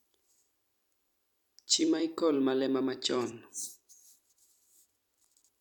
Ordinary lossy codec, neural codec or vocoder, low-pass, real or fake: none; vocoder, 44.1 kHz, 128 mel bands every 256 samples, BigVGAN v2; 19.8 kHz; fake